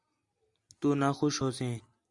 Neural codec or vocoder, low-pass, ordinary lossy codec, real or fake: none; 10.8 kHz; Opus, 64 kbps; real